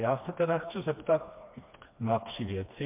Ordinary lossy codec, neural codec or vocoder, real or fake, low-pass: MP3, 32 kbps; codec, 16 kHz, 2 kbps, FreqCodec, smaller model; fake; 3.6 kHz